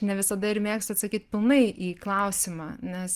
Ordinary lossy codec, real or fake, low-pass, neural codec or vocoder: Opus, 24 kbps; real; 14.4 kHz; none